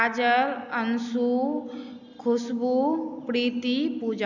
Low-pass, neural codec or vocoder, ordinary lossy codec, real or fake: 7.2 kHz; none; none; real